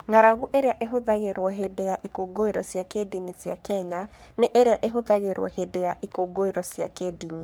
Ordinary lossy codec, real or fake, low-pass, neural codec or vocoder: none; fake; none; codec, 44.1 kHz, 3.4 kbps, Pupu-Codec